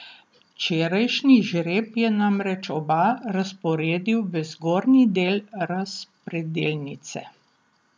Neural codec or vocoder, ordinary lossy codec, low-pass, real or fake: none; none; none; real